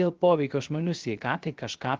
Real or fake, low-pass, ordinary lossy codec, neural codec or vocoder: fake; 7.2 kHz; Opus, 16 kbps; codec, 16 kHz, 0.3 kbps, FocalCodec